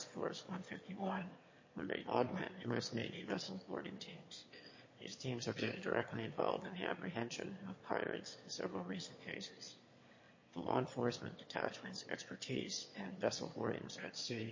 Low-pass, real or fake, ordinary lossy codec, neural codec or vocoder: 7.2 kHz; fake; MP3, 32 kbps; autoencoder, 22.05 kHz, a latent of 192 numbers a frame, VITS, trained on one speaker